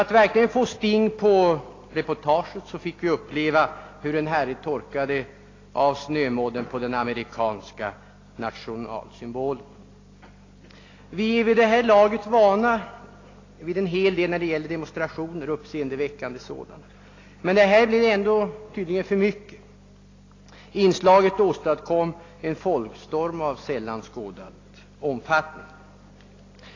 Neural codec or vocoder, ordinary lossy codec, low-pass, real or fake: none; AAC, 32 kbps; 7.2 kHz; real